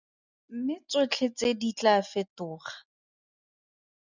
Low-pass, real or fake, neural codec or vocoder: 7.2 kHz; real; none